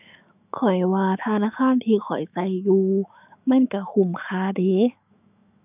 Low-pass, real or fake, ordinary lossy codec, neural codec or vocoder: 3.6 kHz; fake; none; autoencoder, 48 kHz, 128 numbers a frame, DAC-VAE, trained on Japanese speech